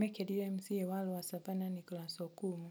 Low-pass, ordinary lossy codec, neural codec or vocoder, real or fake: none; none; none; real